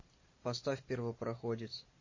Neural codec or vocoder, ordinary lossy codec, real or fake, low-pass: none; MP3, 32 kbps; real; 7.2 kHz